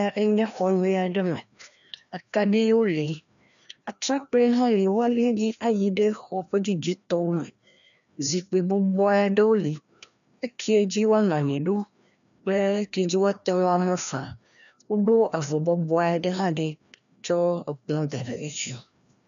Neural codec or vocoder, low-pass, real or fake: codec, 16 kHz, 1 kbps, FreqCodec, larger model; 7.2 kHz; fake